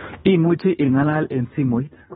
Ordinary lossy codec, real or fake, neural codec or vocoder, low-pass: AAC, 16 kbps; fake; codec, 24 kHz, 3 kbps, HILCodec; 10.8 kHz